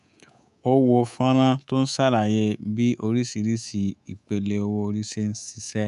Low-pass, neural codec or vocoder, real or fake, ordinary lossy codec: 10.8 kHz; codec, 24 kHz, 3.1 kbps, DualCodec; fake; none